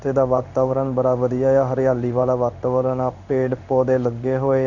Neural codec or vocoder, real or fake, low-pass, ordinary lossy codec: codec, 16 kHz in and 24 kHz out, 1 kbps, XY-Tokenizer; fake; 7.2 kHz; none